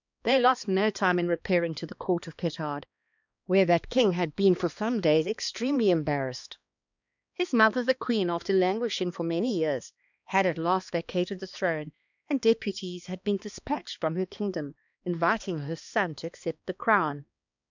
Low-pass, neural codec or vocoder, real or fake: 7.2 kHz; codec, 16 kHz, 2 kbps, X-Codec, HuBERT features, trained on balanced general audio; fake